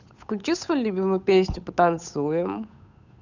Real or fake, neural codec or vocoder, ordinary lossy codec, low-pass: fake; codec, 16 kHz, 8 kbps, FunCodec, trained on Chinese and English, 25 frames a second; none; 7.2 kHz